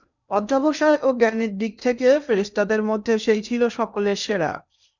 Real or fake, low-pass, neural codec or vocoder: fake; 7.2 kHz; codec, 16 kHz in and 24 kHz out, 0.8 kbps, FocalCodec, streaming, 65536 codes